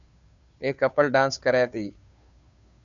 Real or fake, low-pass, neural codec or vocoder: fake; 7.2 kHz; codec, 16 kHz, 2 kbps, FunCodec, trained on Chinese and English, 25 frames a second